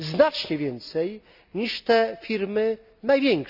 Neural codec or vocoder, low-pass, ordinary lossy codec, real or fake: none; 5.4 kHz; none; real